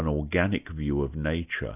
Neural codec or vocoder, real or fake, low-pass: none; real; 3.6 kHz